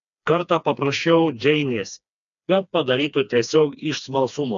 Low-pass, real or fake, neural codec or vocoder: 7.2 kHz; fake; codec, 16 kHz, 2 kbps, FreqCodec, smaller model